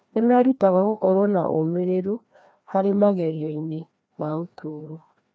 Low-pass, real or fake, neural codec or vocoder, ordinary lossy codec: none; fake; codec, 16 kHz, 1 kbps, FreqCodec, larger model; none